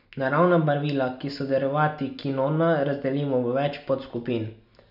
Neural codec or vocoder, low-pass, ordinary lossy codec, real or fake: none; 5.4 kHz; none; real